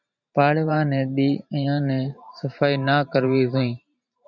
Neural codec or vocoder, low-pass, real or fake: vocoder, 44.1 kHz, 128 mel bands every 512 samples, BigVGAN v2; 7.2 kHz; fake